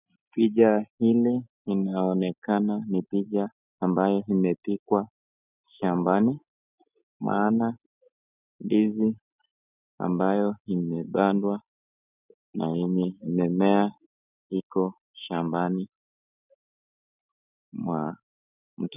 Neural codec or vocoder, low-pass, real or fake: none; 3.6 kHz; real